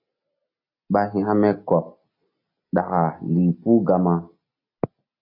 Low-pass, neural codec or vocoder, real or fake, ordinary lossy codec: 5.4 kHz; none; real; AAC, 48 kbps